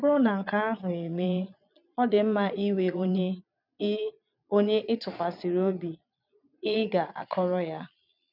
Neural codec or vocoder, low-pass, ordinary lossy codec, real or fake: vocoder, 22.05 kHz, 80 mel bands, Vocos; 5.4 kHz; none; fake